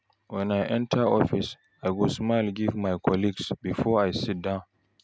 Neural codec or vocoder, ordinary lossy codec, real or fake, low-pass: none; none; real; none